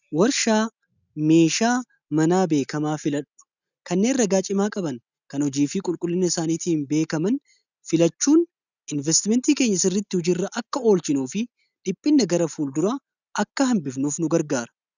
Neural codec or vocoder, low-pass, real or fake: none; 7.2 kHz; real